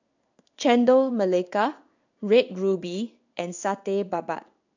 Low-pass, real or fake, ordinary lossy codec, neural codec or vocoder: 7.2 kHz; fake; none; codec, 16 kHz in and 24 kHz out, 1 kbps, XY-Tokenizer